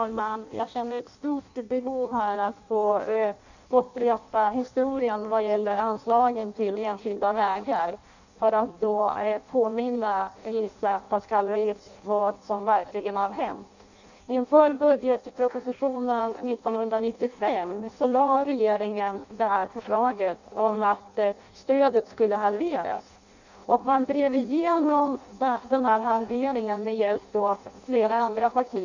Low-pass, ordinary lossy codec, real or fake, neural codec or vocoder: 7.2 kHz; none; fake; codec, 16 kHz in and 24 kHz out, 0.6 kbps, FireRedTTS-2 codec